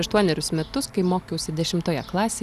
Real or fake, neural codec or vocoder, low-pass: real; none; 14.4 kHz